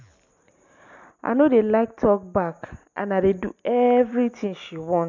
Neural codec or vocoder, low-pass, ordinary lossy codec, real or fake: none; 7.2 kHz; MP3, 64 kbps; real